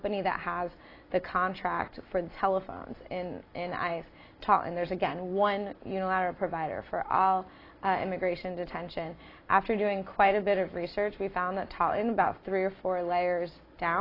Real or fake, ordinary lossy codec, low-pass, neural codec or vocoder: real; AAC, 24 kbps; 5.4 kHz; none